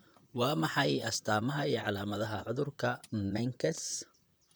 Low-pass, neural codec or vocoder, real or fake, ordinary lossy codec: none; vocoder, 44.1 kHz, 128 mel bands, Pupu-Vocoder; fake; none